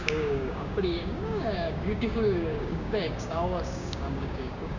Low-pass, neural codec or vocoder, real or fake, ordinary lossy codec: 7.2 kHz; codec, 16 kHz, 6 kbps, DAC; fake; none